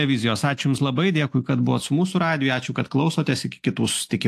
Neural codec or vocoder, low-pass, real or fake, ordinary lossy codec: none; 14.4 kHz; real; AAC, 64 kbps